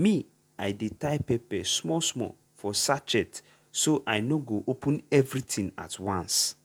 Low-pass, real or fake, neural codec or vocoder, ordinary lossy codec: none; fake; vocoder, 48 kHz, 128 mel bands, Vocos; none